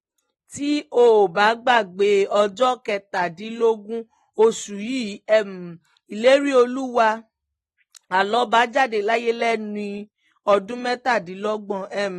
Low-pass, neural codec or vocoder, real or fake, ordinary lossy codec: 19.8 kHz; none; real; AAC, 32 kbps